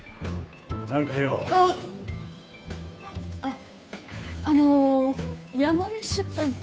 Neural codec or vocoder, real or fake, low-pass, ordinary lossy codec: codec, 16 kHz, 2 kbps, FunCodec, trained on Chinese and English, 25 frames a second; fake; none; none